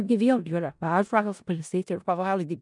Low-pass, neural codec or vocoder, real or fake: 10.8 kHz; codec, 16 kHz in and 24 kHz out, 0.4 kbps, LongCat-Audio-Codec, four codebook decoder; fake